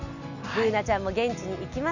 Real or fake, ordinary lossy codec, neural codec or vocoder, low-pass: real; none; none; 7.2 kHz